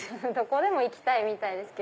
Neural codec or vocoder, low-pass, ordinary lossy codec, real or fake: none; none; none; real